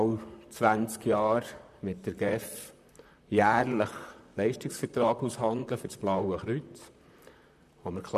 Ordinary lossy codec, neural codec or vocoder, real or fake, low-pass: none; vocoder, 44.1 kHz, 128 mel bands, Pupu-Vocoder; fake; 14.4 kHz